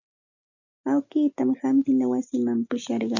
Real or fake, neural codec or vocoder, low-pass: real; none; 7.2 kHz